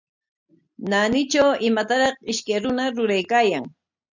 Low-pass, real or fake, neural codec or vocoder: 7.2 kHz; real; none